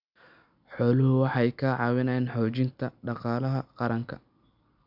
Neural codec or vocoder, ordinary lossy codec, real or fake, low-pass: none; none; real; 5.4 kHz